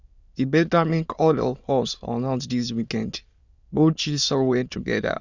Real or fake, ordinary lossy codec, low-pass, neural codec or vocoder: fake; Opus, 64 kbps; 7.2 kHz; autoencoder, 22.05 kHz, a latent of 192 numbers a frame, VITS, trained on many speakers